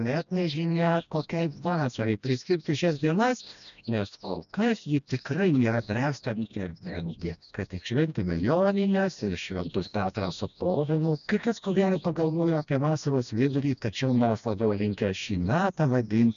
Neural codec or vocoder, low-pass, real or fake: codec, 16 kHz, 1 kbps, FreqCodec, smaller model; 7.2 kHz; fake